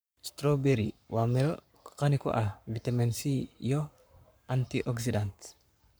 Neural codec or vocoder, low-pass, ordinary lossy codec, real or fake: codec, 44.1 kHz, 7.8 kbps, Pupu-Codec; none; none; fake